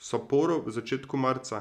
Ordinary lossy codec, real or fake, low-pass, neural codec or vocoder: none; real; 14.4 kHz; none